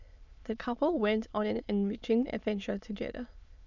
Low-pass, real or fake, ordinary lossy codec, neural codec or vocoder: 7.2 kHz; fake; none; autoencoder, 22.05 kHz, a latent of 192 numbers a frame, VITS, trained on many speakers